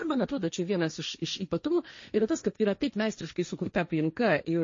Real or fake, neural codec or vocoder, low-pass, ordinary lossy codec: fake; codec, 16 kHz, 1.1 kbps, Voila-Tokenizer; 7.2 kHz; MP3, 32 kbps